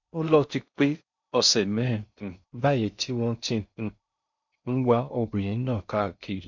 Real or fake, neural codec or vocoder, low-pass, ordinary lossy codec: fake; codec, 16 kHz in and 24 kHz out, 0.6 kbps, FocalCodec, streaming, 4096 codes; 7.2 kHz; none